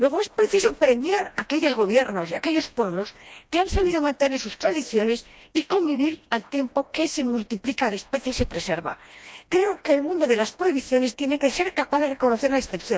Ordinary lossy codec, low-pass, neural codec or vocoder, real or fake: none; none; codec, 16 kHz, 1 kbps, FreqCodec, smaller model; fake